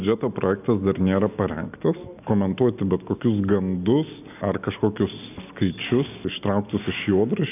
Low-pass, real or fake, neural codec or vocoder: 3.6 kHz; real; none